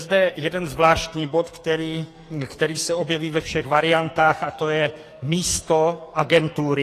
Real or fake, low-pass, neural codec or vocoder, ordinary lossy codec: fake; 14.4 kHz; codec, 44.1 kHz, 2.6 kbps, SNAC; AAC, 48 kbps